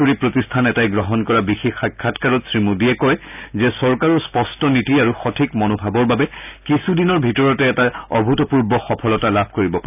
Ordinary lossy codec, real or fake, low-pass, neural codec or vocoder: none; real; 3.6 kHz; none